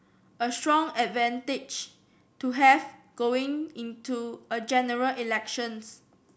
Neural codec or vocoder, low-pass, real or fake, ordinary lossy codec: none; none; real; none